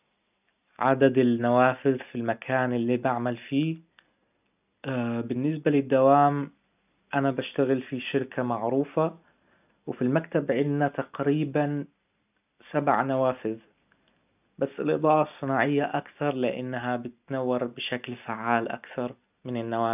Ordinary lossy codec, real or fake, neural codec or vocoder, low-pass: none; real; none; 3.6 kHz